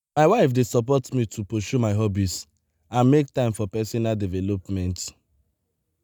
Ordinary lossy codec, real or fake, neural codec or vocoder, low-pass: none; real; none; none